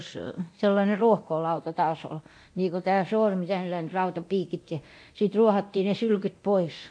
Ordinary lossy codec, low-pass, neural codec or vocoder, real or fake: AAC, 64 kbps; 9.9 kHz; codec, 24 kHz, 0.9 kbps, DualCodec; fake